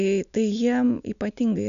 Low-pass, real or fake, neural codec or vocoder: 7.2 kHz; real; none